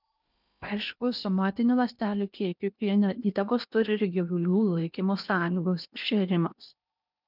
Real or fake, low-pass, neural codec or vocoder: fake; 5.4 kHz; codec, 16 kHz in and 24 kHz out, 0.8 kbps, FocalCodec, streaming, 65536 codes